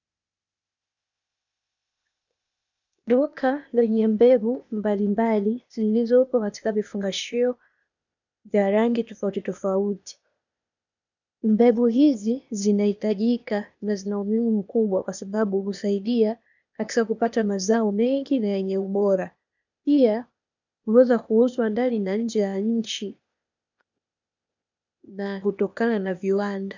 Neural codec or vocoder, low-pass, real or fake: codec, 16 kHz, 0.8 kbps, ZipCodec; 7.2 kHz; fake